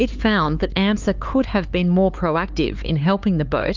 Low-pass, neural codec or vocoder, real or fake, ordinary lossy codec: 7.2 kHz; codec, 16 kHz, 4 kbps, X-Codec, HuBERT features, trained on LibriSpeech; fake; Opus, 24 kbps